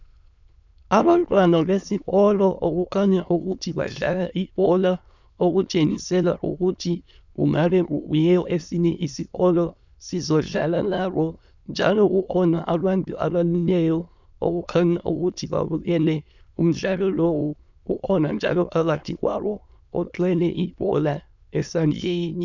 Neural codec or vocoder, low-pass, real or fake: autoencoder, 22.05 kHz, a latent of 192 numbers a frame, VITS, trained on many speakers; 7.2 kHz; fake